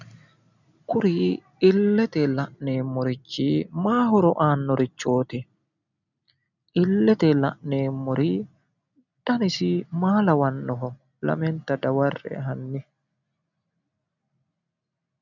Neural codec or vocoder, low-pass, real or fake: none; 7.2 kHz; real